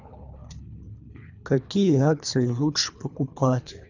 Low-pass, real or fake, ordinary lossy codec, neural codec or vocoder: 7.2 kHz; fake; none; codec, 24 kHz, 3 kbps, HILCodec